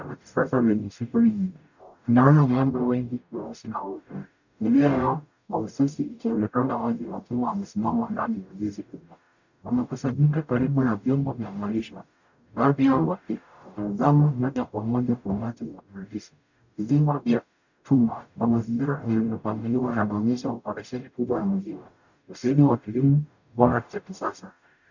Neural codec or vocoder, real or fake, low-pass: codec, 44.1 kHz, 0.9 kbps, DAC; fake; 7.2 kHz